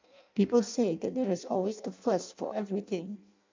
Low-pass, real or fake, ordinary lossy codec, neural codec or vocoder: 7.2 kHz; fake; MP3, 64 kbps; codec, 16 kHz in and 24 kHz out, 0.6 kbps, FireRedTTS-2 codec